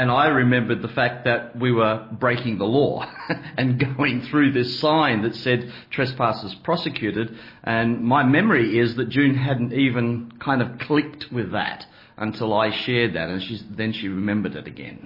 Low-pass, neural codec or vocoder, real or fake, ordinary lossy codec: 5.4 kHz; none; real; MP3, 24 kbps